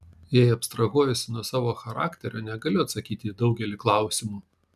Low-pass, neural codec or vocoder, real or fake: 14.4 kHz; none; real